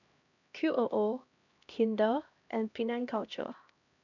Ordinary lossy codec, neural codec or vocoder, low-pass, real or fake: none; codec, 16 kHz, 1 kbps, X-Codec, HuBERT features, trained on LibriSpeech; 7.2 kHz; fake